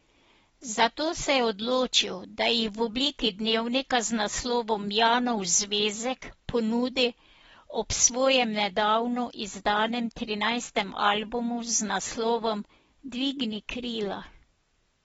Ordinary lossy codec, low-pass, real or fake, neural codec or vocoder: AAC, 24 kbps; 10.8 kHz; real; none